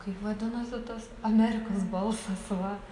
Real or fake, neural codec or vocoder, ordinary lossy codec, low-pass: real; none; AAC, 64 kbps; 10.8 kHz